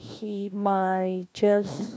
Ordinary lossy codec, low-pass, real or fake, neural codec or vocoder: none; none; fake; codec, 16 kHz, 1 kbps, FunCodec, trained on LibriTTS, 50 frames a second